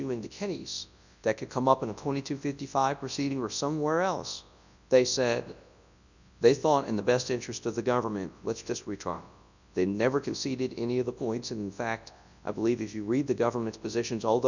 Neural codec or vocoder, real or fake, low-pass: codec, 24 kHz, 0.9 kbps, WavTokenizer, large speech release; fake; 7.2 kHz